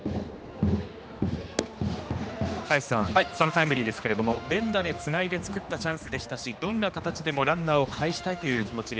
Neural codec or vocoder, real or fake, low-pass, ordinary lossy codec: codec, 16 kHz, 2 kbps, X-Codec, HuBERT features, trained on general audio; fake; none; none